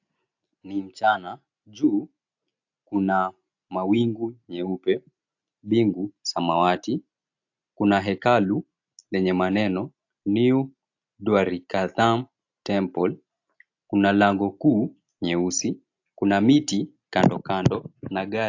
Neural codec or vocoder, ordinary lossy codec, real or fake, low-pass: none; AAC, 48 kbps; real; 7.2 kHz